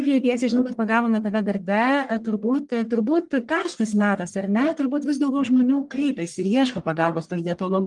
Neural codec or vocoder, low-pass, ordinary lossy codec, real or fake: codec, 44.1 kHz, 1.7 kbps, Pupu-Codec; 10.8 kHz; Opus, 24 kbps; fake